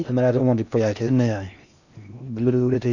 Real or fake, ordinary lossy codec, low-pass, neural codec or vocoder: fake; none; 7.2 kHz; codec, 16 kHz in and 24 kHz out, 0.6 kbps, FocalCodec, streaming, 4096 codes